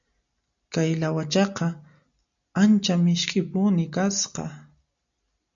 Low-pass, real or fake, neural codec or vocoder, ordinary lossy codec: 7.2 kHz; real; none; MP3, 96 kbps